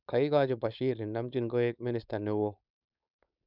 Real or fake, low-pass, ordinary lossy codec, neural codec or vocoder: fake; 5.4 kHz; none; codec, 16 kHz, 4.8 kbps, FACodec